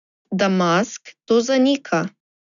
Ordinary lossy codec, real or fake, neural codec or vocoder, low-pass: none; real; none; 7.2 kHz